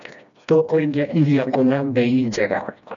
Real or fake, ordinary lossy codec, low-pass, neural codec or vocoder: fake; none; 7.2 kHz; codec, 16 kHz, 1 kbps, FreqCodec, smaller model